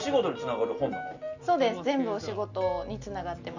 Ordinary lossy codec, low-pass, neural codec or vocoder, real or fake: none; 7.2 kHz; none; real